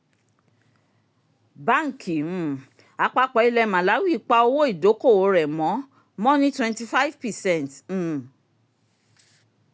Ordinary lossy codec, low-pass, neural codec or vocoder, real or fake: none; none; none; real